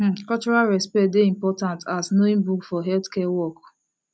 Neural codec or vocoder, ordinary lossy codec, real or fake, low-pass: none; none; real; none